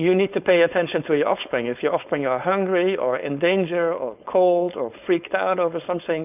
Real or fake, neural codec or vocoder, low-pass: fake; codec, 16 kHz, 4.8 kbps, FACodec; 3.6 kHz